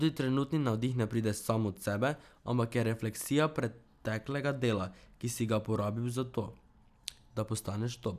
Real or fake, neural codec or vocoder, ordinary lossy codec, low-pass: real; none; none; 14.4 kHz